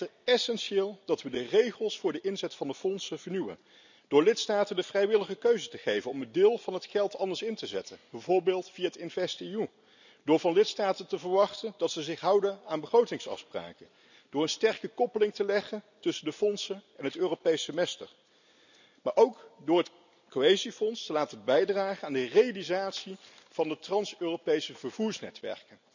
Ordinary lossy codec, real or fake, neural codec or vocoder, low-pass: none; real; none; 7.2 kHz